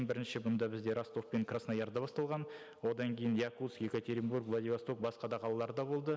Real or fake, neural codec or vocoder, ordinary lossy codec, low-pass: real; none; none; none